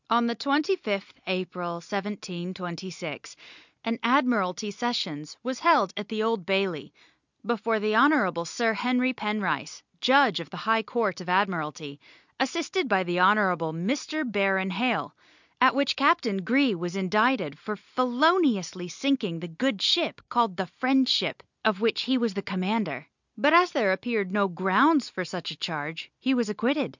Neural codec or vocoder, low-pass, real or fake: none; 7.2 kHz; real